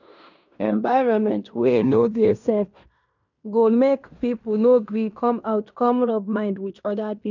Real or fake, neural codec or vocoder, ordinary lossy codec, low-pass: fake; codec, 16 kHz in and 24 kHz out, 0.9 kbps, LongCat-Audio-Codec, fine tuned four codebook decoder; none; 7.2 kHz